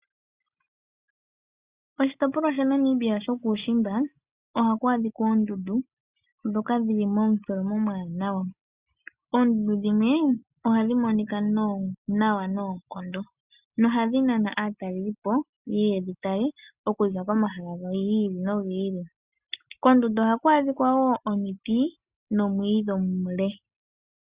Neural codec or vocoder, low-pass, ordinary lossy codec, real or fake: none; 3.6 kHz; Opus, 64 kbps; real